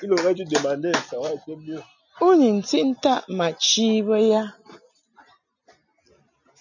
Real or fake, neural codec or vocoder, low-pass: real; none; 7.2 kHz